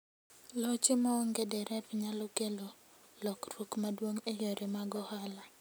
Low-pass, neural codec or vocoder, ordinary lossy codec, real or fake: none; none; none; real